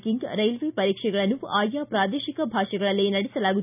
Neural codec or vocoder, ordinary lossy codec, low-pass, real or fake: none; none; 3.6 kHz; real